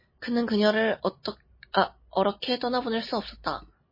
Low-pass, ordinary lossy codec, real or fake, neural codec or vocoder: 5.4 kHz; MP3, 24 kbps; real; none